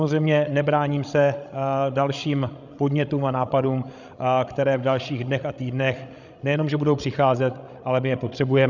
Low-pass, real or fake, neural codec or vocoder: 7.2 kHz; fake; codec, 16 kHz, 16 kbps, FreqCodec, larger model